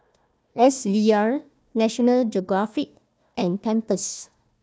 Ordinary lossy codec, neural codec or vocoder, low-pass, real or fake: none; codec, 16 kHz, 1 kbps, FunCodec, trained on Chinese and English, 50 frames a second; none; fake